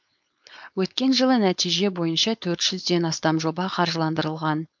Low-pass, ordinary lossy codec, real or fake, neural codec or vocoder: 7.2 kHz; MP3, 64 kbps; fake; codec, 16 kHz, 4.8 kbps, FACodec